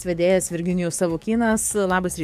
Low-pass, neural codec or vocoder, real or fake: 14.4 kHz; codec, 44.1 kHz, 7.8 kbps, DAC; fake